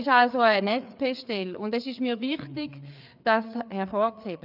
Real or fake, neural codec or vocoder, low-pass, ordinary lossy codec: fake; codec, 16 kHz, 4 kbps, FreqCodec, larger model; 5.4 kHz; none